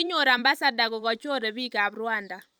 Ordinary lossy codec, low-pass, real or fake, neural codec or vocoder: none; none; fake; vocoder, 44.1 kHz, 128 mel bands every 256 samples, BigVGAN v2